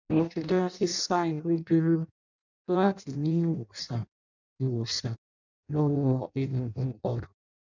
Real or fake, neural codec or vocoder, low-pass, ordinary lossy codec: fake; codec, 16 kHz in and 24 kHz out, 0.6 kbps, FireRedTTS-2 codec; 7.2 kHz; none